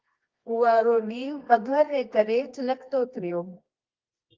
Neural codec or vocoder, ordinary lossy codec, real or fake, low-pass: codec, 24 kHz, 0.9 kbps, WavTokenizer, medium music audio release; Opus, 32 kbps; fake; 7.2 kHz